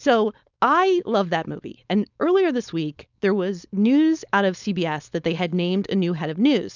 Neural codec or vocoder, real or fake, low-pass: codec, 16 kHz, 4.8 kbps, FACodec; fake; 7.2 kHz